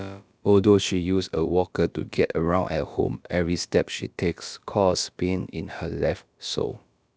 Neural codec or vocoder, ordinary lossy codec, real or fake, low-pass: codec, 16 kHz, about 1 kbps, DyCAST, with the encoder's durations; none; fake; none